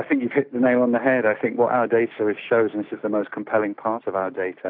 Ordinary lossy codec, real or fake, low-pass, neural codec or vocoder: AAC, 48 kbps; real; 5.4 kHz; none